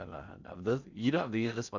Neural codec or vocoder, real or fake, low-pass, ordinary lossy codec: codec, 16 kHz in and 24 kHz out, 0.6 kbps, FocalCodec, streaming, 4096 codes; fake; 7.2 kHz; none